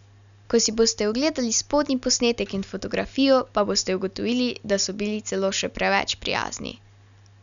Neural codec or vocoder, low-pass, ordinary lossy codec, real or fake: none; 7.2 kHz; none; real